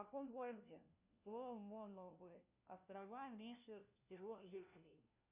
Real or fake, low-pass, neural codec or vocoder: fake; 3.6 kHz; codec, 16 kHz, 1 kbps, FunCodec, trained on LibriTTS, 50 frames a second